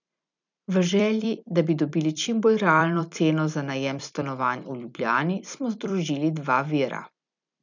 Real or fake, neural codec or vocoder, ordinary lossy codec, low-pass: fake; vocoder, 44.1 kHz, 128 mel bands every 256 samples, BigVGAN v2; none; 7.2 kHz